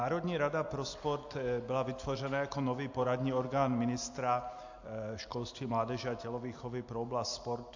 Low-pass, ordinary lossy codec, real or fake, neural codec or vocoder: 7.2 kHz; AAC, 48 kbps; real; none